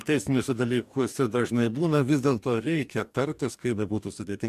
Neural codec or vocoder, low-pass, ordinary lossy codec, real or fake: codec, 44.1 kHz, 2.6 kbps, DAC; 14.4 kHz; MP3, 96 kbps; fake